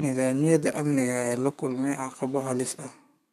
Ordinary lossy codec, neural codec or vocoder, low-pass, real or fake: MP3, 96 kbps; codec, 32 kHz, 1.9 kbps, SNAC; 14.4 kHz; fake